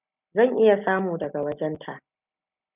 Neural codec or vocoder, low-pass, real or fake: none; 3.6 kHz; real